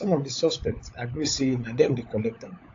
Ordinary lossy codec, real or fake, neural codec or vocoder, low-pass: AAC, 48 kbps; fake; codec, 16 kHz, 16 kbps, FunCodec, trained on LibriTTS, 50 frames a second; 7.2 kHz